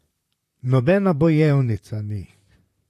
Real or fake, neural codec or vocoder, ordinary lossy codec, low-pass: fake; vocoder, 44.1 kHz, 128 mel bands, Pupu-Vocoder; AAC, 64 kbps; 14.4 kHz